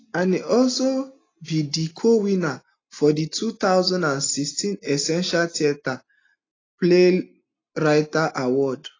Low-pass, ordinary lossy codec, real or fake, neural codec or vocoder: 7.2 kHz; AAC, 32 kbps; real; none